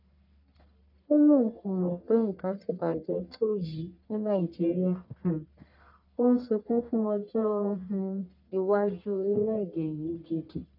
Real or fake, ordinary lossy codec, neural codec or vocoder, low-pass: fake; none; codec, 44.1 kHz, 1.7 kbps, Pupu-Codec; 5.4 kHz